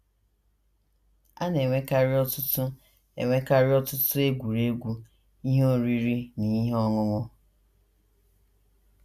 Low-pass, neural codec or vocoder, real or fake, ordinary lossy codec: 14.4 kHz; none; real; none